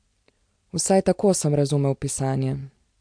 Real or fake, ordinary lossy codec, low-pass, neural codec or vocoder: real; MP3, 64 kbps; 9.9 kHz; none